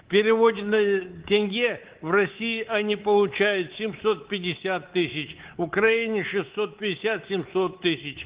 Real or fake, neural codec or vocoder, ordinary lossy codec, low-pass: fake; codec, 24 kHz, 3.1 kbps, DualCodec; Opus, 16 kbps; 3.6 kHz